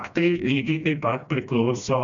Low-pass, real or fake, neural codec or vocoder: 7.2 kHz; fake; codec, 16 kHz, 1 kbps, FreqCodec, smaller model